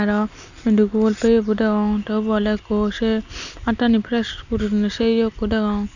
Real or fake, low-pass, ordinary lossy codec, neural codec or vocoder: real; 7.2 kHz; none; none